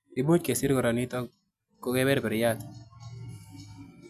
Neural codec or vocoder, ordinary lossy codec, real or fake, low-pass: none; none; real; 14.4 kHz